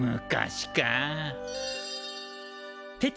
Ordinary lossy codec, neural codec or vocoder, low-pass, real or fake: none; none; none; real